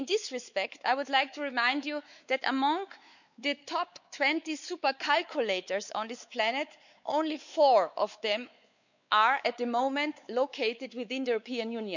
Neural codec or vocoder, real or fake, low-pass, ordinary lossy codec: codec, 16 kHz, 4 kbps, X-Codec, WavLM features, trained on Multilingual LibriSpeech; fake; 7.2 kHz; none